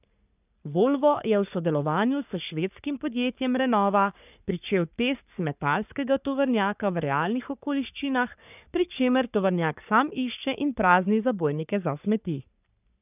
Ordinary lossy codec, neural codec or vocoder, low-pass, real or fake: none; codec, 44.1 kHz, 3.4 kbps, Pupu-Codec; 3.6 kHz; fake